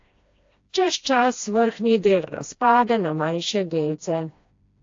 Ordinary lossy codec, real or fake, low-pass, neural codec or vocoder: AAC, 48 kbps; fake; 7.2 kHz; codec, 16 kHz, 1 kbps, FreqCodec, smaller model